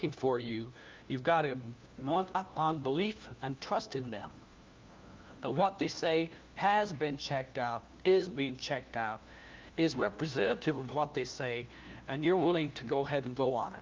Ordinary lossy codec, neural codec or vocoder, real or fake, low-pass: Opus, 24 kbps; codec, 16 kHz, 1 kbps, FunCodec, trained on LibriTTS, 50 frames a second; fake; 7.2 kHz